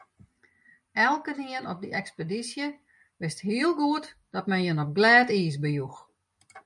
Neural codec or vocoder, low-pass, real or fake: none; 10.8 kHz; real